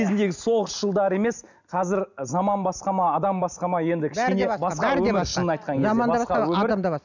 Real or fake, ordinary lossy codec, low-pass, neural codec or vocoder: real; none; 7.2 kHz; none